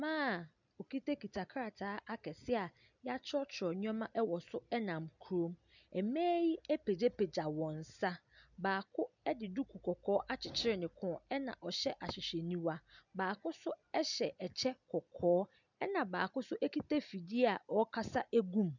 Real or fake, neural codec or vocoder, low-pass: real; none; 7.2 kHz